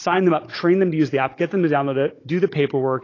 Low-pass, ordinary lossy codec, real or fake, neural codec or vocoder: 7.2 kHz; AAC, 32 kbps; fake; codec, 16 kHz, 16 kbps, FunCodec, trained on Chinese and English, 50 frames a second